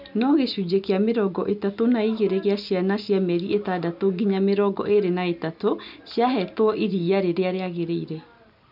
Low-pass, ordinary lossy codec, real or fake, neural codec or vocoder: 5.4 kHz; none; real; none